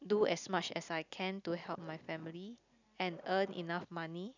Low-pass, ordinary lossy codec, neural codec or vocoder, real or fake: 7.2 kHz; none; none; real